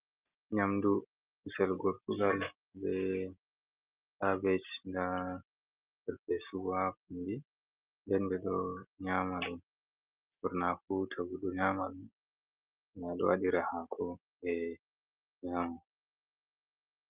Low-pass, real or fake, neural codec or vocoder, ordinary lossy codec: 3.6 kHz; real; none; Opus, 32 kbps